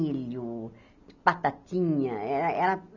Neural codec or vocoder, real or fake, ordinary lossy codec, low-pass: none; real; none; 7.2 kHz